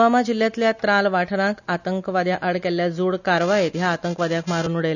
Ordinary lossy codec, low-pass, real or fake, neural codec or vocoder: none; 7.2 kHz; real; none